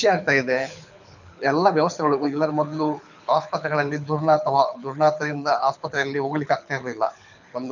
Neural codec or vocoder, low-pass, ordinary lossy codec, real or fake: codec, 24 kHz, 6 kbps, HILCodec; 7.2 kHz; none; fake